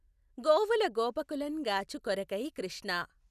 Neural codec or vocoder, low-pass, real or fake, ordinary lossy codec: none; 14.4 kHz; real; none